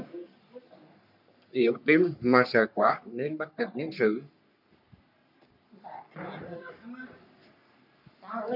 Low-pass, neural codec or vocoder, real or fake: 5.4 kHz; codec, 44.1 kHz, 3.4 kbps, Pupu-Codec; fake